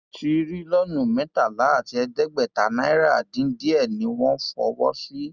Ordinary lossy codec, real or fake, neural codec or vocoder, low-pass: Opus, 64 kbps; real; none; 7.2 kHz